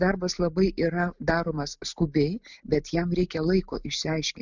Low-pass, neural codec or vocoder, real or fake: 7.2 kHz; none; real